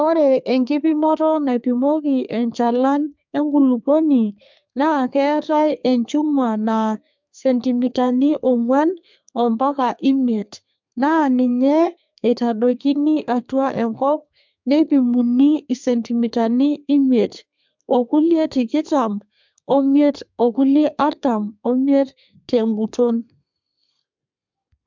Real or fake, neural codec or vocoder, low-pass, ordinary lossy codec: fake; codec, 32 kHz, 1.9 kbps, SNAC; 7.2 kHz; MP3, 64 kbps